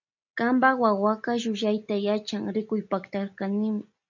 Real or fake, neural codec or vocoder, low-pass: real; none; 7.2 kHz